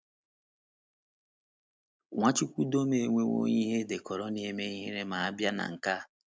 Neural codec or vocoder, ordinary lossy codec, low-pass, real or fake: none; none; none; real